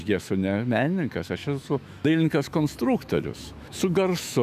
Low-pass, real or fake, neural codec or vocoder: 14.4 kHz; fake; autoencoder, 48 kHz, 128 numbers a frame, DAC-VAE, trained on Japanese speech